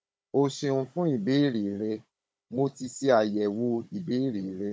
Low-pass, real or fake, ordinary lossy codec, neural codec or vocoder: none; fake; none; codec, 16 kHz, 16 kbps, FunCodec, trained on Chinese and English, 50 frames a second